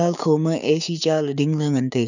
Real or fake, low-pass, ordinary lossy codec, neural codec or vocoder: fake; 7.2 kHz; none; codec, 16 kHz, 6 kbps, DAC